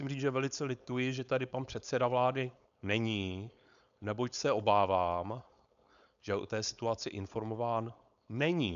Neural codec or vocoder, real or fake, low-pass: codec, 16 kHz, 4.8 kbps, FACodec; fake; 7.2 kHz